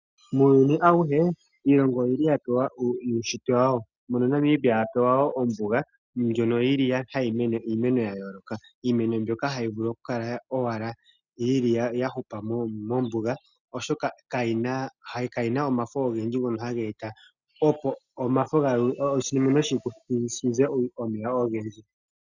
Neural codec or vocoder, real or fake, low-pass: none; real; 7.2 kHz